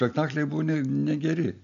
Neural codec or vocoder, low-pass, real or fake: none; 7.2 kHz; real